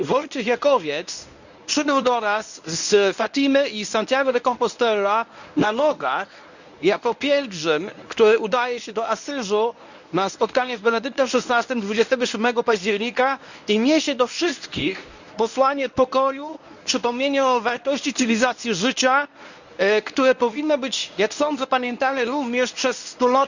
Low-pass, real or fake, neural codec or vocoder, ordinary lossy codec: 7.2 kHz; fake; codec, 24 kHz, 0.9 kbps, WavTokenizer, medium speech release version 1; none